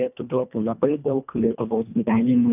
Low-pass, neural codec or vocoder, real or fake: 3.6 kHz; codec, 24 kHz, 1.5 kbps, HILCodec; fake